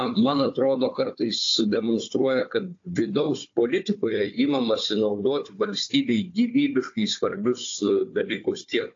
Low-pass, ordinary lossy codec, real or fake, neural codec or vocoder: 7.2 kHz; AAC, 48 kbps; fake; codec, 16 kHz, 4 kbps, FunCodec, trained on Chinese and English, 50 frames a second